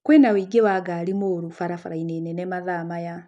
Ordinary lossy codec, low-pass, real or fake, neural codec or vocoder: none; 10.8 kHz; real; none